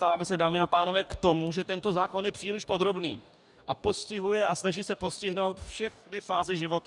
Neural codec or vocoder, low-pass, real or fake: codec, 44.1 kHz, 2.6 kbps, DAC; 10.8 kHz; fake